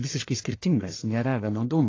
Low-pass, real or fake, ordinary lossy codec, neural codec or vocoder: 7.2 kHz; fake; AAC, 32 kbps; codec, 44.1 kHz, 1.7 kbps, Pupu-Codec